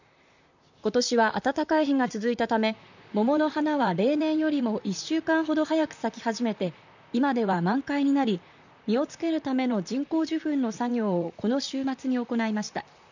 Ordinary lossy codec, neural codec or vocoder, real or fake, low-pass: none; vocoder, 44.1 kHz, 128 mel bands, Pupu-Vocoder; fake; 7.2 kHz